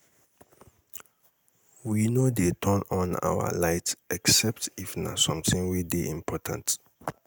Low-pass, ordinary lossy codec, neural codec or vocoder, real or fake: none; none; none; real